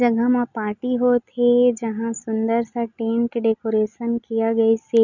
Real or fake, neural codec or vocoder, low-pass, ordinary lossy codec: real; none; 7.2 kHz; none